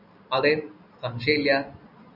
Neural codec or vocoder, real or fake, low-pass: none; real; 5.4 kHz